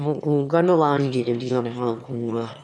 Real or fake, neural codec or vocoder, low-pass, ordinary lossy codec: fake; autoencoder, 22.05 kHz, a latent of 192 numbers a frame, VITS, trained on one speaker; none; none